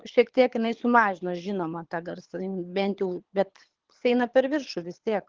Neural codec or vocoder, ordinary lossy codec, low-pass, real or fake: codec, 24 kHz, 6 kbps, HILCodec; Opus, 16 kbps; 7.2 kHz; fake